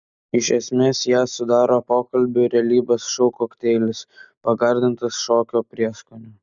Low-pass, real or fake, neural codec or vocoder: 7.2 kHz; real; none